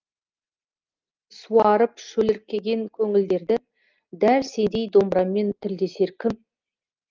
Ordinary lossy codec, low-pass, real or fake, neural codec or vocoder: Opus, 24 kbps; 7.2 kHz; real; none